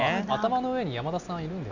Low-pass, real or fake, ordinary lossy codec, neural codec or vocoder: 7.2 kHz; real; none; none